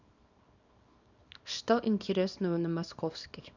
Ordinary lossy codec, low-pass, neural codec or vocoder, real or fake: none; 7.2 kHz; codec, 24 kHz, 0.9 kbps, WavTokenizer, small release; fake